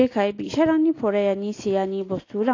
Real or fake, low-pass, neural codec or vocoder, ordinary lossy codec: real; 7.2 kHz; none; AAC, 32 kbps